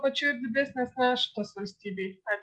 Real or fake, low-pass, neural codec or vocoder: real; 10.8 kHz; none